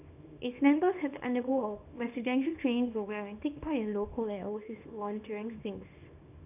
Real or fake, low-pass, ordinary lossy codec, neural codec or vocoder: fake; 3.6 kHz; none; codec, 24 kHz, 0.9 kbps, WavTokenizer, small release